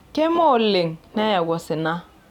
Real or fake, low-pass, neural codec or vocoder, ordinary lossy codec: real; 19.8 kHz; none; none